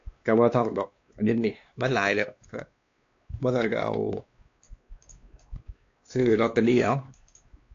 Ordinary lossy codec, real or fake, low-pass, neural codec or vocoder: AAC, 48 kbps; fake; 7.2 kHz; codec, 16 kHz, 2 kbps, X-Codec, WavLM features, trained on Multilingual LibriSpeech